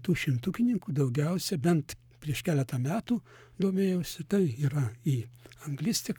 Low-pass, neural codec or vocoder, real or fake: 19.8 kHz; codec, 44.1 kHz, 7.8 kbps, Pupu-Codec; fake